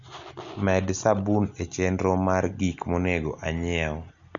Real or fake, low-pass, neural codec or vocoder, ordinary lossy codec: real; 7.2 kHz; none; none